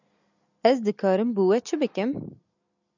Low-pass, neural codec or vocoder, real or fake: 7.2 kHz; none; real